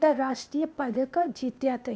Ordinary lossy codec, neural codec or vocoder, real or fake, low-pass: none; codec, 16 kHz, 0.8 kbps, ZipCodec; fake; none